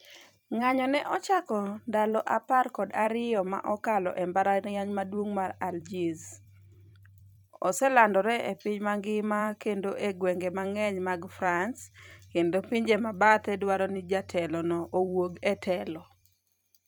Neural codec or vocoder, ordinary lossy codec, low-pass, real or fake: vocoder, 44.1 kHz, 128 mel bands every 256 samples, BigVGAN v2; none; none; fake